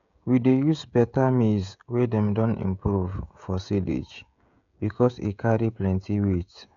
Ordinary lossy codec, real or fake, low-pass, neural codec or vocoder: none; fake; 7.2 kHz; codec, 16 kHz, 16 kbps, FreqCodec, smaller model